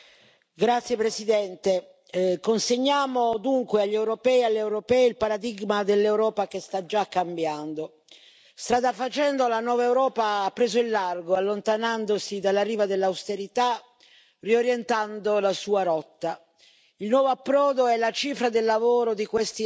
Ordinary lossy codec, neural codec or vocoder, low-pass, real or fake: none; none; none; real